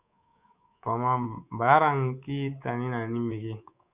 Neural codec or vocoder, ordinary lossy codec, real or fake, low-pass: codec, 24 kHz, 3.1 kbps, DualCodec; Opus, 64 kbps; fake; 3.6 kHz